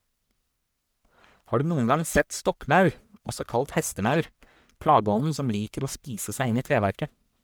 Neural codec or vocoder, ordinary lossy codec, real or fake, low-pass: codec, 44.1 kHz, 1.7 kbps, Pupu-Codec; none; fake; none